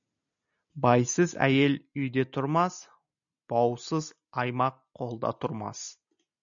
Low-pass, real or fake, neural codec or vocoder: 7.2 kHz; real; none